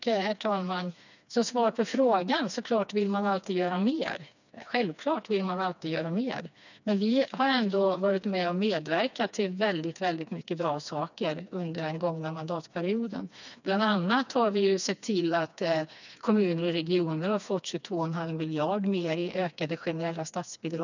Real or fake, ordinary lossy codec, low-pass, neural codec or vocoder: fake; none; 7.2 kHz; codec, 16 kHz, 2 kbps, FreqCodec, smaller model